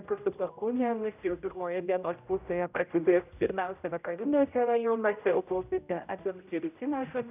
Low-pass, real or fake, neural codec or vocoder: 3.6 kHz; fake; codec, 16 kHz, 0.5 kbps, X-Codec, HuBERT features, trained on general audio